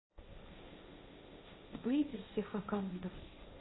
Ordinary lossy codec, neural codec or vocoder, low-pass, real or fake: AAC, 16 kbps; codec, 16 kHz, 1.1 kbps, Voila-Tokenizer; 7.2 kHz; fake